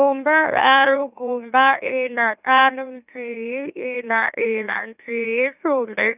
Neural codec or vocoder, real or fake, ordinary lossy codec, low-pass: autoencoder, 44.1 kHz, a latent of 192 numbers a frame, MeloTTS; fake; none; 3.6 kHz